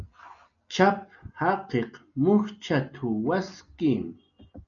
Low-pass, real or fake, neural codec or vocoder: 7.2 kHz; real; none